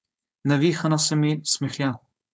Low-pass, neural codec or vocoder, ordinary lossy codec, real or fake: none; codec, 16 kHz, 4.8 kbps, FACodec; none; fake